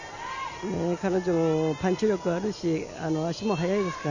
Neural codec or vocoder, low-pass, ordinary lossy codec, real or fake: none; 7.2 kHz; none; real